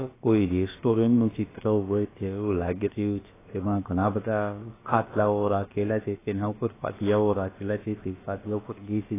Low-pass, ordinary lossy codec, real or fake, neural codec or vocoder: 3.6 kHz; AAC, 16 kbps; fake; codec, 16 kHz, about 1 kbps, DyCAST, with the encoder's durations